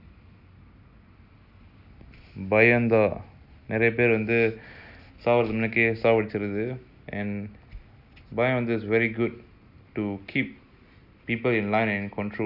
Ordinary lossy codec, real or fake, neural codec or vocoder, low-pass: Opus, 64 kbps; real; none; 5.4 kHz